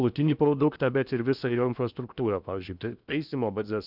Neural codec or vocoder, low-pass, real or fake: codec, 16 kHz in and 24 kHz out, 0.8 kbps, FocalCodec, streaming, 65536 codes; 5.4 kHz; fake